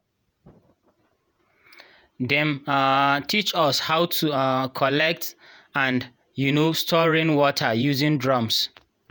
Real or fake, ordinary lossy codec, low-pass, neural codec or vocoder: fake; none; none; vocoder, 48 kHz, 128 mel bands, Vocos